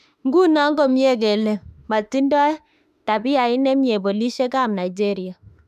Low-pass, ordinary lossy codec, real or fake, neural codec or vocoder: 14.4 kHz; none; fake; autoencoder, 48 kHz, 32 numbers a frame, DAC-VAE, trained on Japanese speech